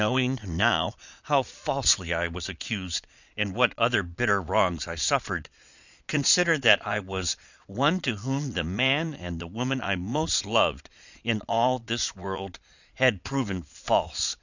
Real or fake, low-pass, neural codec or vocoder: fake; 7.2 kHz; vocoder, 22.05 kHz, 80 mel bands, Vocos